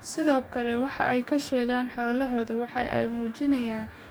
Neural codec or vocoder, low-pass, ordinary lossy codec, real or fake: codec, 44.1 kHz, 2.6 kbps, DAC; none; none; fake